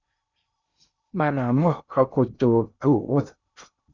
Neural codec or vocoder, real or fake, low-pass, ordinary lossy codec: codec, 16 kHz in and 24 kHz out, 0.6 kbps, FocalCodec, streaming, 2048 codes; fake; 7.2 kHz; Opus, 64 kbps